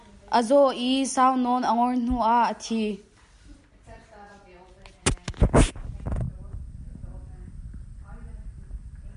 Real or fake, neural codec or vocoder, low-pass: real; none; 10.8 kHz